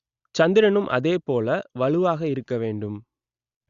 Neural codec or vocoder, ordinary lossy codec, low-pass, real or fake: none; Opus, 64 kbps; 7.2 kHz; real